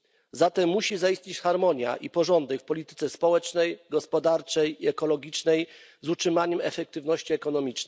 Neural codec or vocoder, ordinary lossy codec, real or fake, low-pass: none; none; real; none